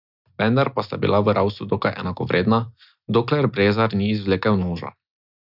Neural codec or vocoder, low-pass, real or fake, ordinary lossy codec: none; 5.4 kHz; real; none